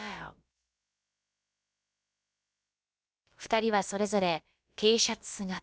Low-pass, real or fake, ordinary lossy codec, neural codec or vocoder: none; fake; none; codec, 16 kHz, about 1 kbps, DyCAST, with the encoder's durations